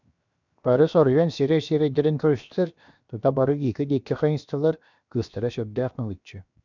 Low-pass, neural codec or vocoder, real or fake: 7.2 kHz; codec, 16 kHz, 0.7 kbps, FocalCodec; fake